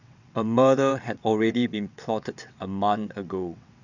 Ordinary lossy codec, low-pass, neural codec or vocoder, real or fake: none; 7.2 kHz; vocoder, 22.05 kHz, 80 mel bands, WaveNeXt; fake